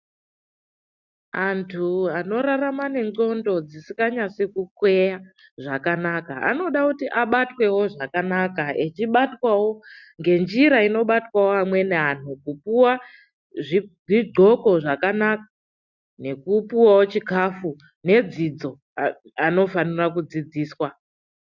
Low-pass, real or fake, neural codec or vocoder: 7.2 kHz; real; none